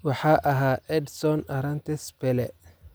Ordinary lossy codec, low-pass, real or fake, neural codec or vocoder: none; none; real; none